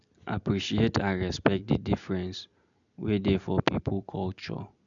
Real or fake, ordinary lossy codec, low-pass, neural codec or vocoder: real; MP3, 96 kbps; 7.2 kHz; none